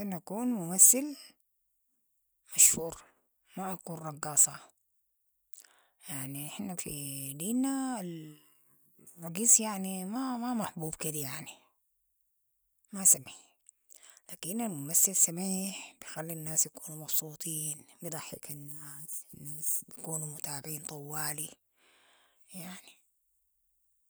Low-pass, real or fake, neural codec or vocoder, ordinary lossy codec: none; real; none; none